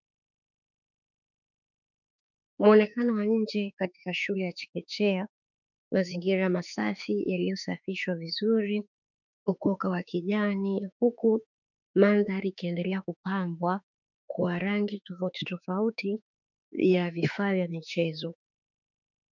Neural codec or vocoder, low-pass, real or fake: autoencoder, 48 kHz, 32 numbers a frame, DAC-VAE, trained on Japanese speech; 7.2 kHz; fake